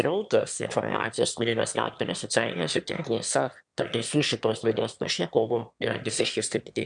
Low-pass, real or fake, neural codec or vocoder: 9.9 kHz; fake; autoencoder, 22.05 kHz, a latent of 192 numbers a frame, VITS, trained on one speaker